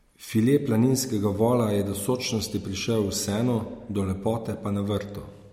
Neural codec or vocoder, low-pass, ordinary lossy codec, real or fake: none; 19.8 kHz; MP3, 64 kbps; real